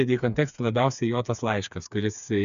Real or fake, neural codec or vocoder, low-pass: fake; codec, 16 kHz, 4 kbps, FreqCodec, smaller model; 7.2 kHz